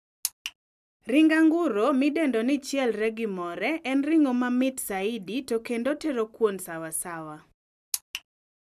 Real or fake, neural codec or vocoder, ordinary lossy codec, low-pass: real; none; none; 14.4 kHz